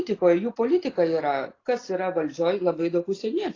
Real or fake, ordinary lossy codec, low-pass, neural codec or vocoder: real; AAC, 32 kbps; 7.2 kHz; none